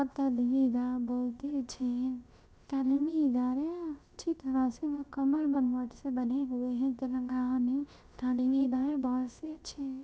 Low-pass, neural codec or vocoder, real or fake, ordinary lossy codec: none; codec, 16 kHz, about 1 kbps, DyCAST, with the encoder's durations; fake; none